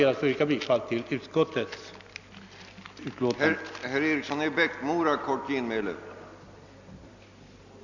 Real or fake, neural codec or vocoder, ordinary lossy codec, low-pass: real; none; none; 7.2 kHz